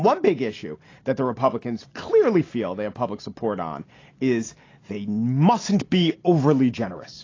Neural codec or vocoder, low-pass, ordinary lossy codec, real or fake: none; 7.2 kHz; AAC, 32 kbps; real